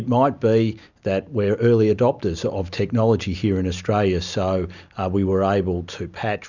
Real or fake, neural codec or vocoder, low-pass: real; none; 7.2 kHz